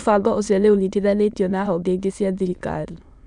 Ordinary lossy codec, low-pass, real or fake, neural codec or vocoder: none; 9.9 kHz; fake; autoencoder, 22.05 kHz, a latent of 192 numbers a frame, VITS, trained on many speakers